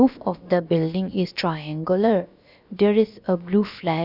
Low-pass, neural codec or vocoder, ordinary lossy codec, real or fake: 5.4 kHz; codec, 16 kHz, about 1 kbps, DyCAST, with the encoder's durations; none; fake